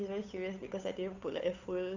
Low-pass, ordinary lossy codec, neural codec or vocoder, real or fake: 7.2 kHz; none; codec, 16 kHz, 8 kbps, FunCodec, trained on Chinese and English, 25 frames a second; fake